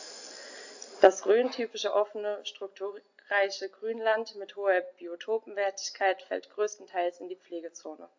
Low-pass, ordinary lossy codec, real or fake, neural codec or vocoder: 7.2 kHz; AAC, 48 kbps; real; none